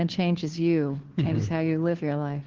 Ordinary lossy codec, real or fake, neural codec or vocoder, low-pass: Opus, 16 kbps; fake; codec, 16 kHz, 2 kbps, FunCodec, trained on Chinese and English, 25 frames a second; 7.2 kHz